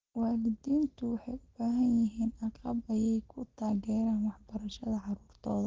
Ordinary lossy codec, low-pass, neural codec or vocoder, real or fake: Opus, 16 kbps; 7.2 kHz; none; real